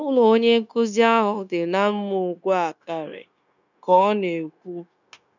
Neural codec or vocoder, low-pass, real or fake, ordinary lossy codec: codec, 16 kHz, 0.9 kbps, LongCat-Audio-Codec; 7.2 kHz; fake; none